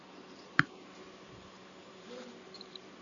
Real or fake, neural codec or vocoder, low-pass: real; none; 7.2 kHz